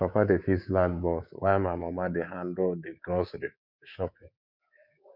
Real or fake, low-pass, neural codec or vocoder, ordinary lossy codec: fake; 5.4 kHz; codec, 24 kHz, 3.1 kbps, DualCodec; none